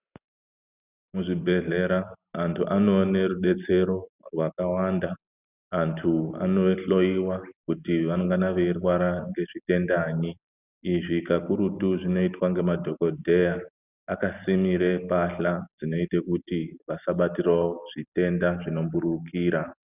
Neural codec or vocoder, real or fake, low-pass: none; real; 3.6 kHz